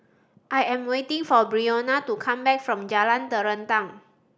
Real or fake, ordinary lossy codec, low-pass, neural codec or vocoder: real; none; none; none